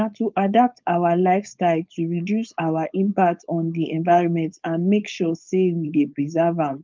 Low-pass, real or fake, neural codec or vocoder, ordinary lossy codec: 7.2 kHz; fake; codec, 16 kHz, 4.8 kbps, FACodec; Opus, 32 kbps